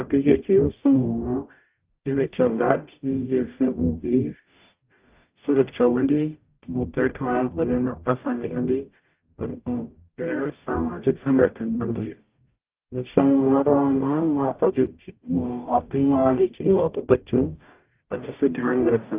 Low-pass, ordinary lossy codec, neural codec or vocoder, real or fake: 3.6 kHz; Opus, 24 kbps; codec, 44.1 kHz, 0.9 kbps, DAC; fake